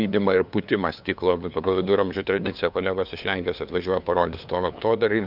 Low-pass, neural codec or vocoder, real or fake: 5.4 kHz; codec, 16 kHz, 2 kbps, FunCodec, trained on LibriTTS, 25 frames a second; fake